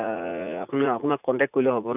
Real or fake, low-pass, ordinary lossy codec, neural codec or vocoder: fake; 3.6 kHz; none; vocoder, 44.1 kHz, 80 mel bands, Vocos